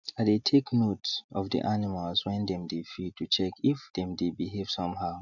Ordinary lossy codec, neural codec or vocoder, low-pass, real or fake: none; none; 7.2 kHz; real